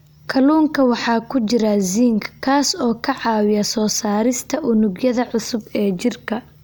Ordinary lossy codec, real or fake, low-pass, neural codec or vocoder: none; real; none; none